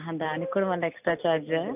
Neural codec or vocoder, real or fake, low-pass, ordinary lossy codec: none; real; 3.6 kHz; none